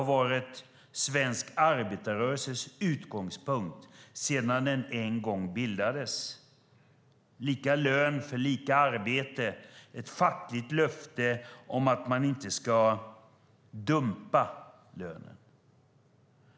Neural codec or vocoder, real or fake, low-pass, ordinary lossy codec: none; real; none; none